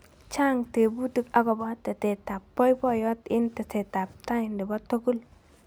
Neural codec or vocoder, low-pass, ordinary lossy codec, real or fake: none; none; none; real